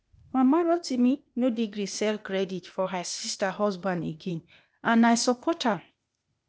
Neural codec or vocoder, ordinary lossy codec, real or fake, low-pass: codec, 16 kHz, 0.8 kbps, ZipCodec; none; fake; none